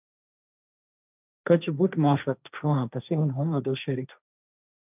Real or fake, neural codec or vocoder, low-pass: fake; codec, 16 kHz, 1.1 kbps, Voila-Tokenizer; 3.6 kHz